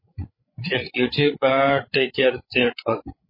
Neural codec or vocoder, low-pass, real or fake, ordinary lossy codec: codec, 16 kHz, 16 kbps, FreqCodec, larger model; 5.4 kHz; fake; MP3, 24 kbps